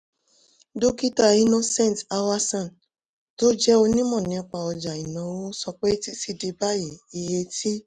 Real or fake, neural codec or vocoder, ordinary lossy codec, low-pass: real; none; none; none